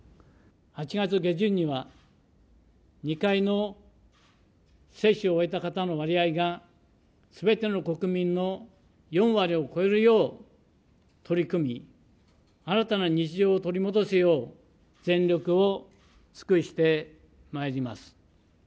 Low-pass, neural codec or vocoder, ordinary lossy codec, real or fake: none; none; none; real